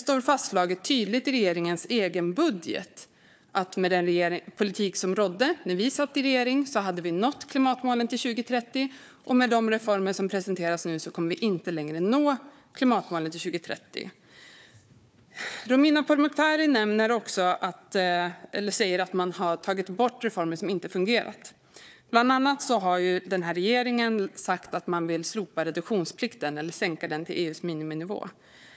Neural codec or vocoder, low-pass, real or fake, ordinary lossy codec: codec, 16 kHz, 4 kbps, FunCodec, trained on Chinese and English, 50 frames a second; none; fake; none